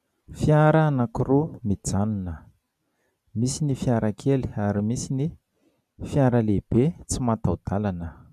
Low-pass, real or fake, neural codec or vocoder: 14.4 kHz; real; none